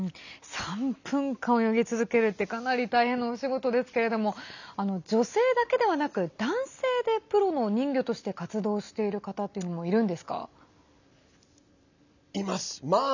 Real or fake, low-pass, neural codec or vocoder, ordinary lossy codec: real; 7.2 kHz; none; none